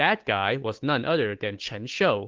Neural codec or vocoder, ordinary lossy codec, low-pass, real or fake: codec, 16 kHz, 8 kbps, FunCodec, trained on Chinese and English, 25 frames a second; Opus, 16 kbps; 7.2 kHz; fake